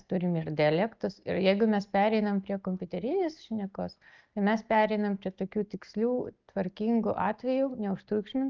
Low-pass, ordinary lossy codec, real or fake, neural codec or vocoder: 7.2 kHz; Opus, 24 kbps; real; none